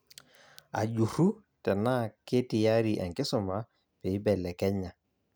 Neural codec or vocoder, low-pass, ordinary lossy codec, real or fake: none; none; none; real